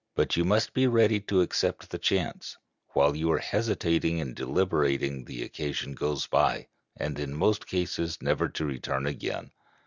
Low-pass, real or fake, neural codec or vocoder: 7.2 kHz; real; none